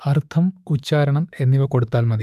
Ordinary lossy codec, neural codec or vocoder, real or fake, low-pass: none; autoencoder, 48 kHz, 32 numbers a frame, DAC-VAE, trained on Japanese speech; fake; 14.4 kHz